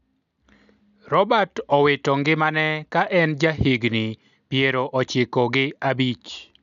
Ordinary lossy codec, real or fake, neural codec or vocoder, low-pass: none; real; none; 7.2 kHz